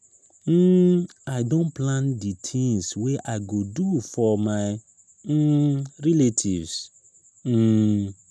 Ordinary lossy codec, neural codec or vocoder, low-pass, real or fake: none; none; none; real